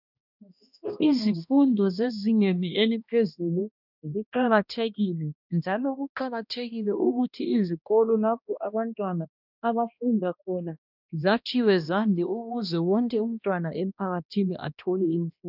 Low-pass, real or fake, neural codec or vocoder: 5.4 kHz; fake; codec, 16 kHz, 1 kbps, X-Codec, HuBERT features, trained on balanced general audio